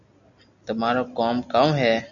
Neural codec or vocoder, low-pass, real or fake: none; 7.2 kHz; real